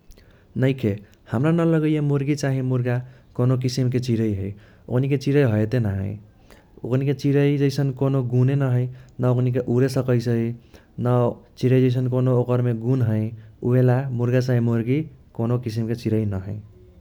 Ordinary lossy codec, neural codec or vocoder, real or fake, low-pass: none; none; real; 19.8 kHz